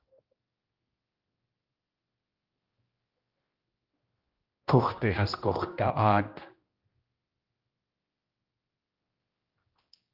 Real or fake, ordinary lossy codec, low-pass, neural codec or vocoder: fake; Opus, 32 kbps; 5.4 kHz; codec, 16 kHz, 1 kbps, X-Codec, HuBERT features, trained on general audio